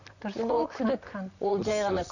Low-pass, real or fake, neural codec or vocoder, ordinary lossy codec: 7.2 kHz; fake; vocoder, 44.1 kHz, 128 mel bands, Pupu-Vocoder; none